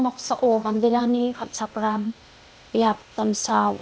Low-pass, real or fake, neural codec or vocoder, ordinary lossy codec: none; fake; codec, 16 kHz, 0.8 kbps, ZipCodec; none